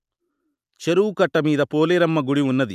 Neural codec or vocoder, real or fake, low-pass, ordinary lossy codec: none; real; 14.4 kHz; none